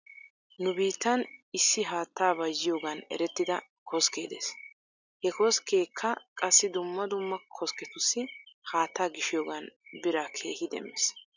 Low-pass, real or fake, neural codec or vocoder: 7.2 kHz; real; none